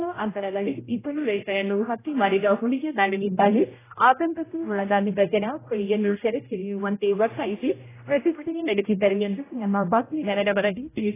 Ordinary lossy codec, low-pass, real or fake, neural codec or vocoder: AAC, 16 kbps; 3.6 kHz; fake; codec, 16 kHz, 0.5 kbps, X-Codec, HuBERT features, trained on general audio